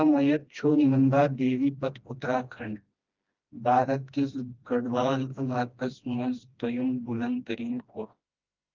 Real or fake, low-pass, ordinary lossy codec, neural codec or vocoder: fake; 7.2 kHz; Opus, 24 kbps; codec, 16 kHz, 1 kbps, FreqCodec, smaller model